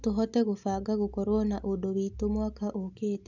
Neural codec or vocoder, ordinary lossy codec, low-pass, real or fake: none; none; 7.2 kHz; real